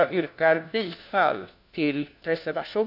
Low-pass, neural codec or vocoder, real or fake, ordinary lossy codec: 5.4 kHz; codec, 16 kHz, 1 kbps, FunCodec, trained on LibriTTS, 50 frames a second; fake; none